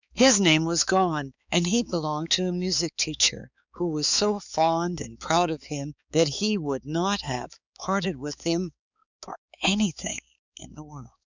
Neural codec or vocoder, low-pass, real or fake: codec, 16 kHz, 4 kbps, X-Codec, HuBERT features, trained on balanced general audio; 7.2 kHz; fake